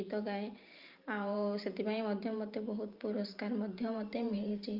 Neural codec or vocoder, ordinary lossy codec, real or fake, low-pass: vocoder, 44.1 kHz, 128 mel bands every 512 samples, BigVGAN v2; Opus, 24 kbps; fake; 5.4 kHz